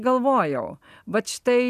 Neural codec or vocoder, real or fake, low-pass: none; real; 14.4 kHz